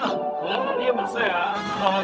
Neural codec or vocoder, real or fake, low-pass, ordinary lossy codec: codec, 16 kHz, 0.4 kbps, LongCat-Audio-Codec; fake; none; none